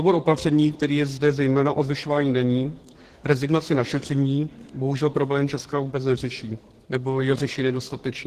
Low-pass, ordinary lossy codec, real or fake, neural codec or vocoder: 14.4 kHz; Opus, 16 kbps; fake; codec, 44.1 kHz, 2.6 kbps, SNAC